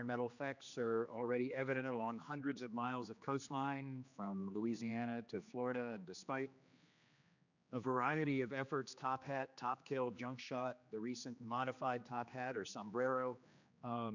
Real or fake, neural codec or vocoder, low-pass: fake; codec, 16 kHz, 2 kbps, X-Codec, HuBERT features, trained on general audio; 7.2 kHz